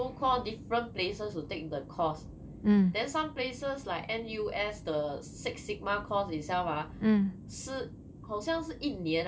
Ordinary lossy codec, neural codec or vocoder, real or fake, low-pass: none; none; real; none